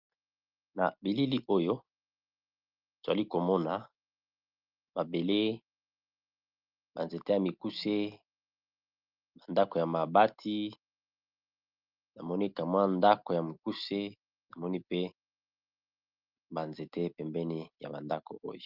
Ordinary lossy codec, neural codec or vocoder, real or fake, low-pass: Opus, 32 kbps; none; real; 5.4 kHz